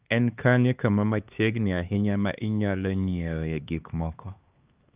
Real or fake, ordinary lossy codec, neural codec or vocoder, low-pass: fake; Opus, 24 kbps; codec, 16 kHz, 2 kbps, X-Codec, HuBERT features, trained on LibriSpeech; 3.6 kHz